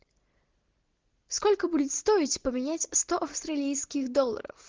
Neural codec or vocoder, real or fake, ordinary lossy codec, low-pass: none; real; Opus, 16 kbps; 7.2 kHz